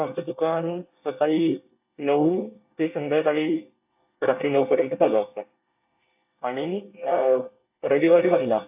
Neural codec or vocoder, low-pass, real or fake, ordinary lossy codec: codec, 24 kHz, 1 kbps, SNAC; 3.6 kHz; fake; AAC, 32 kbps